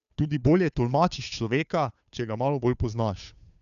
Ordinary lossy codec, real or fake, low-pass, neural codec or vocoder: none; fake; 7.2 kHz; codec, 16 kHz, 2 kbps, FunCodec, trained on Chinese and English, 25 frames a second